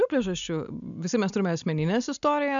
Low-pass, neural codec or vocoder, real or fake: 7.2 kHz; none; real